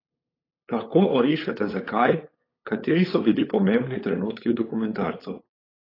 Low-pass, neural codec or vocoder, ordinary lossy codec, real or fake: 5.4 kHz; codec, 16 kHz, 8 kbps, FunCodec, trained on LibriTTS, 25 frames a second; AAC, 24 kbps; fake